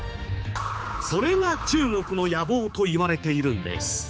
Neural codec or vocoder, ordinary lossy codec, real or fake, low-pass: codec, 16 kHz, 4 kbps, X-Codec, HuBERT features, trained on general audio; none; fake; none